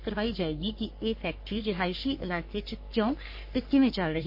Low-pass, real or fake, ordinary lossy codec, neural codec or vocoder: 5.4 kHz; fake; MP3, 32 kbps; codec, 44.1 kHz, 3.4 kbps, Pupu-Codec